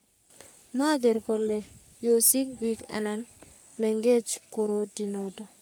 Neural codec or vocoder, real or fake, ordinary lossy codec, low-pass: codec, 44.1 kHz, 3.4 kbps, Pupu-Codec; fake; none; none